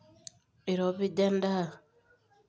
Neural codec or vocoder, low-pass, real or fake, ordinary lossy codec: none; none; real; none